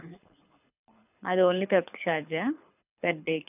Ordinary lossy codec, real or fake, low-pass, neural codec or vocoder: none; fake; 3.6 kHz; codec, 24 kHz, 6 kbps, HILCodec